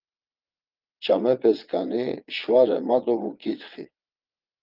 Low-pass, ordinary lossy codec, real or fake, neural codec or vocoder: 5.4 kHz; Opus, 24 kbps; fake; vocoder, 22.05 kHz, 80 mel bands, WaveNeXt